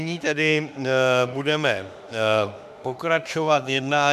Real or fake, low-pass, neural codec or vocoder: fake; 14.4 kHz; autoencoder, 48 kHz, 32 numbers a frame, DAC-VAE, trained on Japanese speech